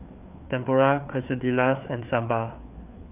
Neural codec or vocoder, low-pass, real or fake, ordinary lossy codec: codec, 16 kHz, 4 kbps, FreqCodec, larger model; 3.6 kHz; fake; none